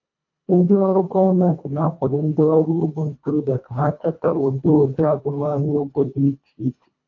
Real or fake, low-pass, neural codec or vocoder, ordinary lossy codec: fake; 7.2 kHz; codec, 24 kHz, 1.5 kbps, HILCodec; MP3, 48 kbps